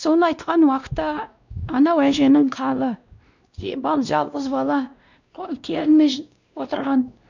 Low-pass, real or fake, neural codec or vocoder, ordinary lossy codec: 7.2 kHz; fake; codec, 16 kHz, 1 kbps, X-Codec, WavLM features, trained on Multilingual LibriSpeech; none